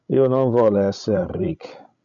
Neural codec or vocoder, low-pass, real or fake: codec, 16 kHz, 16 kbps, FreqCodec, larger model; 7.2 kHz; fake